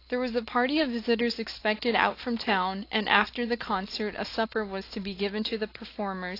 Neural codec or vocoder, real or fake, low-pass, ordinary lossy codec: none; real; 5.4 kHz; AAC, 32 kbps